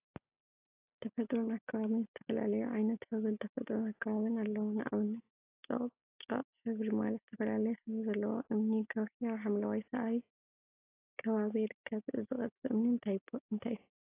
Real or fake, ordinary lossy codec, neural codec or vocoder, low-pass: real; AAC, 24 kbps; none; 3.6 kHz